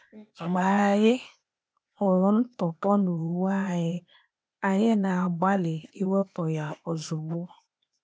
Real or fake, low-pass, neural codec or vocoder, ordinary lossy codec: fake; none; codec, 16 kHz, 0.8 kbps, ZipCodec; none